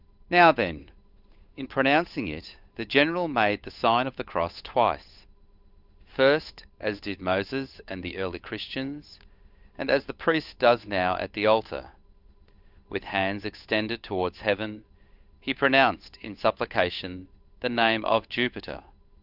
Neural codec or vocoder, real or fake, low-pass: codec, 16 kHz, 8 kbps, FunCodec, trained on Chinese and English, 25 frames a second; fake; 5.4 kHz